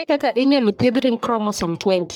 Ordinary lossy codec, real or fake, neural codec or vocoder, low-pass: none; fake; codec, 44.1 kHz, 1.7 kbps, Pupu-Codec; none